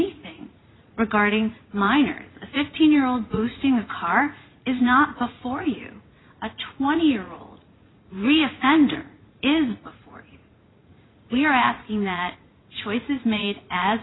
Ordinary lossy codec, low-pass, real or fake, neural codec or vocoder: AAC, 16 kbps; 7.2 kHz; fake; vocoder, 44.1 kHz, 80 mel bands, Vocos